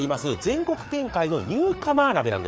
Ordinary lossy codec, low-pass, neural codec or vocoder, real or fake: none; none; codec, 16 kHz, 4 kbps, FreqCodec, larger model; fake